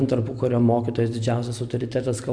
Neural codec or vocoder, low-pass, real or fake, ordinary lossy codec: none; 9.9 kHz; real; MP3, 64 kbps